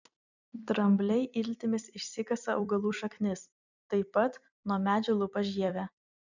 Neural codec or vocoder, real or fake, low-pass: vocoder, 44.1 kHz, 128 mel bands every 256 samples, BigVGAN v2; fake; 7.2 kHz